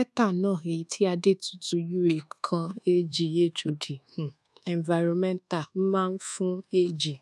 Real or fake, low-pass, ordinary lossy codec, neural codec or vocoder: fake; none; none; codec, 24 kHz, 1.2 kbps, DualCodec